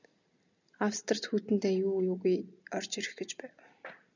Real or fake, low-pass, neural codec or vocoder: real; 7.2 kHz; none